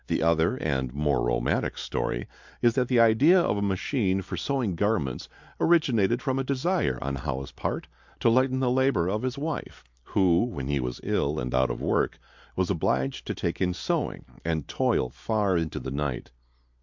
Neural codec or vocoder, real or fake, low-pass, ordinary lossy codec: none; real; 7.2 kHz; MP3, 64 kbps